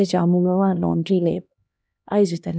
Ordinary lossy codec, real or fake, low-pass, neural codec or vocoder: none; fake; none; codec, 16 kHz, 1 kbps, X-Codec, HuBERT features, trained on LibriSpeech